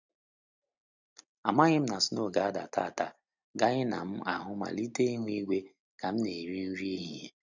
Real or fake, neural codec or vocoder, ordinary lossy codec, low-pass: real; none; none; 7.2 kHz